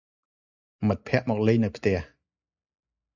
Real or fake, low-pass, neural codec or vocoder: real; 7.2 kHz; none